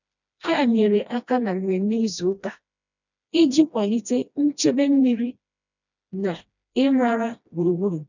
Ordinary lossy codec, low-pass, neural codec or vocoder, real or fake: none; 7.2 kHz; codec, 16 kHz, 1 kbps, FreqCodec, smaller model; fake